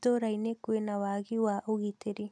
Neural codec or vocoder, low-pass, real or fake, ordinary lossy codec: none; none; real; none